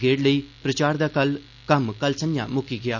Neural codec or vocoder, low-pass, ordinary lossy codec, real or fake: none; 7.2 kHz; none; real